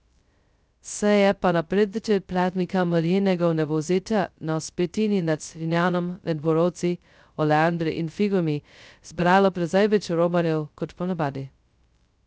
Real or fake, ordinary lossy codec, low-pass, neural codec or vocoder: fake; none; none; codec, 16 kHz, 0.2 kbps, FocalCodec